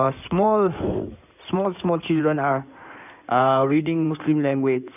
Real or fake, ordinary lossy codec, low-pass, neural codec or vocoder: fake; none; 3.6 kHz; codec, 16 kHz in and 24 kHz out, 2.2 kbps, FireRedTTS-2 codec